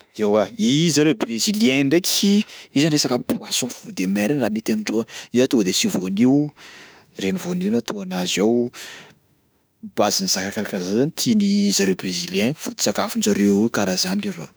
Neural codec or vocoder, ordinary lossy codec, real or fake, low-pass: autoencoder, 48 kHz, 32 numbers a frame, DAC-VAE, trained on Japanese speech; none; fake; none